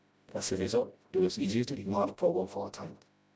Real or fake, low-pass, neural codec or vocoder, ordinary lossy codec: fake; none; codec, 16 kHz, 0.5 kbps, FreqCodec, smaller model; none